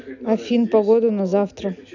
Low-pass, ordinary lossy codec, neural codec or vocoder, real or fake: 7.2 kHz; none; none; real